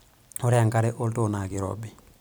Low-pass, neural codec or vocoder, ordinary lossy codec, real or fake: none; vocoder, 44.1 kHz, 128 mel bands every 256 samples, BigVGAN v2; none; fake